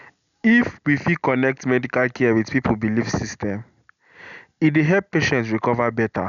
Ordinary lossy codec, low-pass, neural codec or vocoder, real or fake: none; 7.2 kHz; none; real